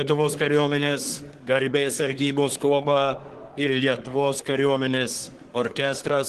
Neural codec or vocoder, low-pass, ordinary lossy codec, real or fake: codec, 24 kHz, 1 kbps, SNAC; 10.8 kHz; Opus, 16 kbps; fake